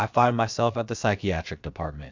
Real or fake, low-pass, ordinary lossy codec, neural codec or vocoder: fake; 7.2 kHz; MP3, 64 kbps; codec, 16 kHz, about 1 kbps, DyCAST, with the encoder's durations